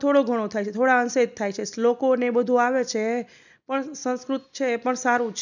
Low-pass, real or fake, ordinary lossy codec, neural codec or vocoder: 7.2 kHz; real; none; none